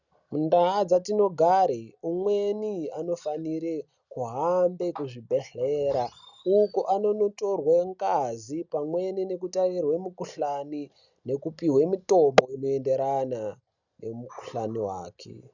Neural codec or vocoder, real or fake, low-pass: none; real; 7.2 kHz